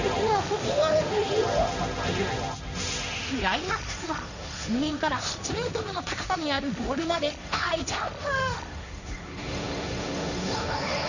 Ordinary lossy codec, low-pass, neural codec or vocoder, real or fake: none; 7.2 kHz; codec, 16 kHz, 1.1 kbps, Voila-Tokenizer; fake